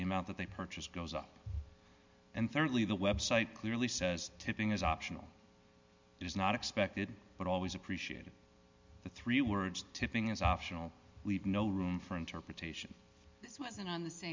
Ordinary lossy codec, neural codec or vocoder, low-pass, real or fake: MP3, 64 kbps; none; 7.2 kHz; real